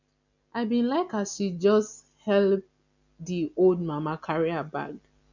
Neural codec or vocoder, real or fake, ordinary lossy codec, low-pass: none; real; none; 7.2 kHz